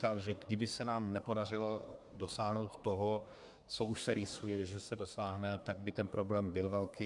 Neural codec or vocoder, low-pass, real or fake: codec, 24 kHz, 1 kbps, SNAC; 10.8 kHz; fake